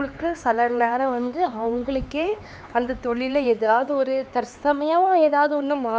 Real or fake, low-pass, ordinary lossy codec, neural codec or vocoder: fake; none; none; codec, 16 kHz, 4 kbps, X-Codec, HuBERT features, trained on LibriSpeech